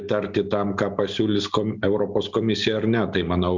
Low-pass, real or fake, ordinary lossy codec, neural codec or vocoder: 7.2 kHz; real; Opus, 64 kbps; none